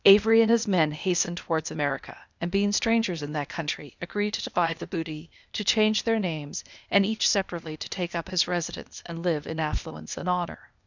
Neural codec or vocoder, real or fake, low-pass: codec, 16 kHz, 0.8 kbps, ZipCodec; fake; 7.2 kHz